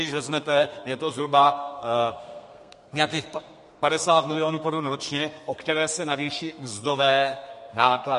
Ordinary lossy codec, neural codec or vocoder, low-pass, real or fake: MP3, 48 kbps; codec, 32 kHz, 1.9 kbps, SNAC; 14.4 kHz; fake